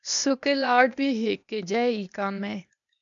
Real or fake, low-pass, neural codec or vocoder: fake; 7.2 kHz; codec, 16 kHz, 0.8 kbps, ZipCodec